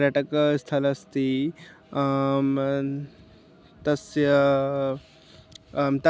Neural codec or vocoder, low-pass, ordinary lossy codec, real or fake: none; none; none; real